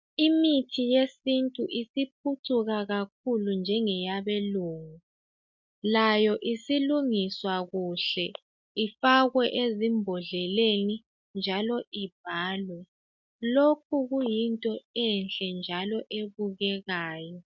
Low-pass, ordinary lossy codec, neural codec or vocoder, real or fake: 7.2 kHz; MP3, 64 kbps; none; real